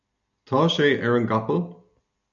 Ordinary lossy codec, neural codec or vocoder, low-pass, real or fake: MP3, 64 kbps; none; 7.2 kHz; real